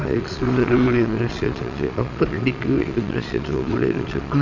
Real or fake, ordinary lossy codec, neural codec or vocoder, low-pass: fake; none; vocoder, 22.05 kHz, 80 mel bands, Vocos; 7.2 kHz